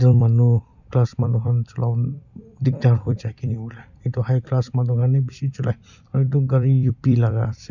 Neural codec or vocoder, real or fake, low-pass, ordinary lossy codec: vocoder, 44.1 kHz, 128 mel bands every 256 samples, BigVGAN v2; fake; 7.2 kHz; none